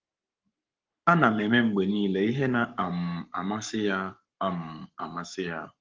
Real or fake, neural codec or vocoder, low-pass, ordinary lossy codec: fake; codec, 44.1 kHz, 7.8 kbps, Pupu-Codec; 7.2 kHz; Opus, 32 kbps